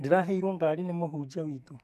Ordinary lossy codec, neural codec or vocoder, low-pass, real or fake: none; codec, 44.1 kHz, 2.6 kbps, SNAC; 14.4 kHz; fake